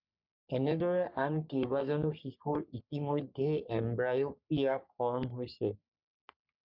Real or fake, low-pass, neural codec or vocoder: fake; 5.4 kHz; codec, 44.1 kHz, 3.4 kbps, Pupu-Codec